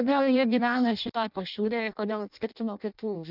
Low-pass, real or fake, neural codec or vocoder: 5.4 kHz; fake; codec, 16 kHz in and 24 kHz out, 0.6 kbps, FireRedTTS-2 codec